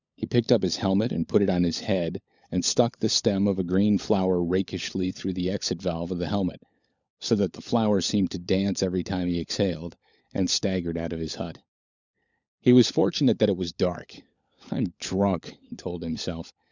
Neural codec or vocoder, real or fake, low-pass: codec, 16 kHz, 16 kbps, FunCodec, trained on LibriTTS, 50 frames a second; fake; 7.2 kHz